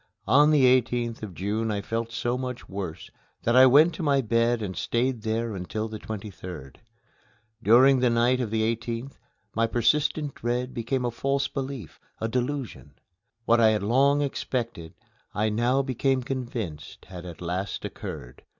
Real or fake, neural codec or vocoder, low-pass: real; none; 7.2 kHz